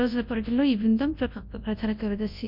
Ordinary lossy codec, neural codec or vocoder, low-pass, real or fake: MP3, 48 kbps; codec, 24 kHz, 0.9 kbps, WavTokenizer, large speech release; 5.4 kHz; fake